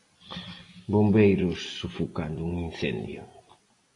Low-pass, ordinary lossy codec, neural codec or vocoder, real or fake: 10.8 kHz; AAC, 48 kbps; none; real